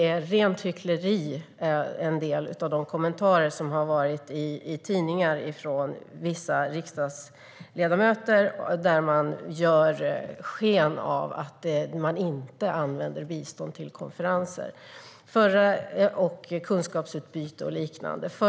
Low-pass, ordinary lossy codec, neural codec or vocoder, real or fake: none; none; none; real